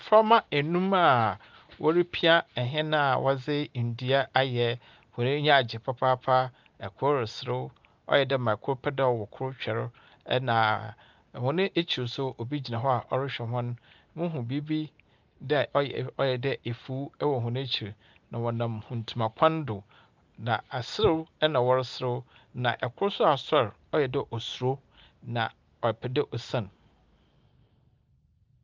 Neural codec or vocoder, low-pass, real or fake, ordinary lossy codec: none; 7.2 kHz; real; Opus, 24 kbps